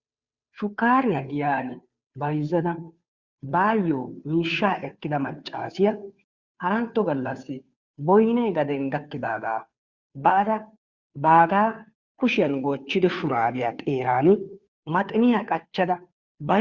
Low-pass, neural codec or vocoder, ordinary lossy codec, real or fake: 7.2 kHz; codec, 16 kHz, 2 kbps, FunCodec, trained on Chinese and English, 25 frames a second; Opus, 64 kbps; fake